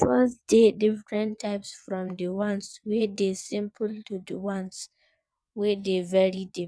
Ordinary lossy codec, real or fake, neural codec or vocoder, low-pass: none; fake; vocoder, 22.05 kHz, 80 mel bands, WaveNeXt; none